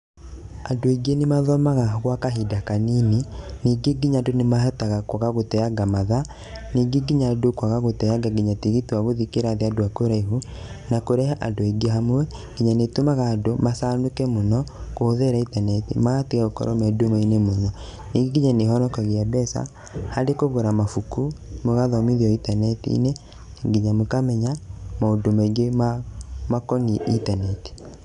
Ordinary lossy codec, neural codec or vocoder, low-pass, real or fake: none; none; 10.8 kHz; real